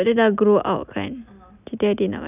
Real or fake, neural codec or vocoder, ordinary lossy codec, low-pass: real; none; none; 3.6 kHz